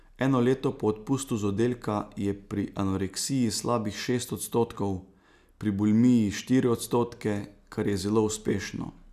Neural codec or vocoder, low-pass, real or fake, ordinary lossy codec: none; 14.4 kHz; real; none